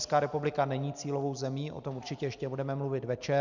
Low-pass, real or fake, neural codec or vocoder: 7.2 kHz; real; none